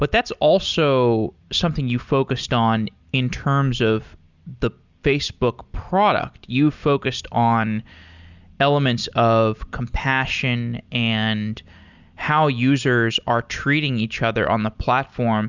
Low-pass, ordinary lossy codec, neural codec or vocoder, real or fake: 7.2 kHz; Opus, 64 kbps; none; real